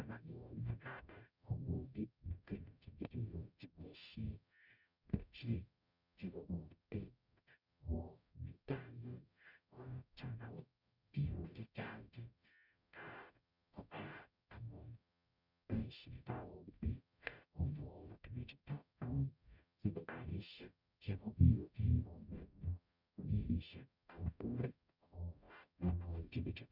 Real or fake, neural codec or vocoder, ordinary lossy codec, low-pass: fake; codec, 44.1 kHz, 0.9 kbps, DAC; none; 5.4 kHz